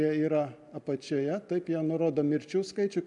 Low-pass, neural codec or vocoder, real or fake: 10.8 kHz; none; real